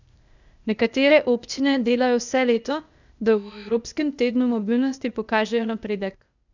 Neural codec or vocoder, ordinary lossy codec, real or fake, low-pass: codec, 16 kHz, 0.8 kbps, ZipCodec; none; fake; 7.2 kHz